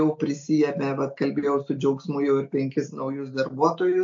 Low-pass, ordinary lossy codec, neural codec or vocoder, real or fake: 7.2 kHz; MP3, 48 kbps; none; real